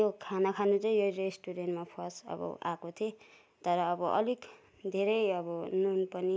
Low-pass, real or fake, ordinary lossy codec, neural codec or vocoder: none; real; none; none